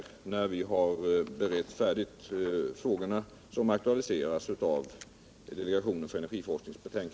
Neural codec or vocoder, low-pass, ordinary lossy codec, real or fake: none; none; none; real